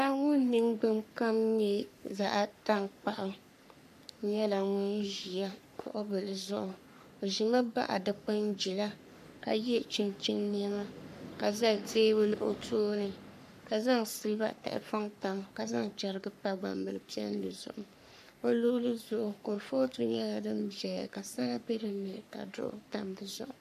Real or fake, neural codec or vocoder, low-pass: fake; codec, 44.1 kHz, 3.4 kbps, Pupu-Codec; 14.4 kHz